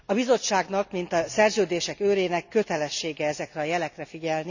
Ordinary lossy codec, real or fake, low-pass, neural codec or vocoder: none; real; 7.2 kHz; none